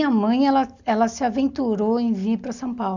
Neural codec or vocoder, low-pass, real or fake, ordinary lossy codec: none; 7.2 kHz; real; none